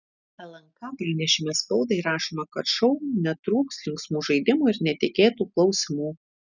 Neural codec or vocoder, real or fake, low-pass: none; real; 7.2 kHz